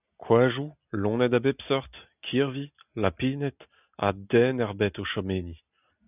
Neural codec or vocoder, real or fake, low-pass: none; real; 3.6 kHz